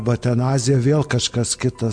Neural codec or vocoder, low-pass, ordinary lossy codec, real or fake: none; 9.9 kHz; MP3, 64 kbps; real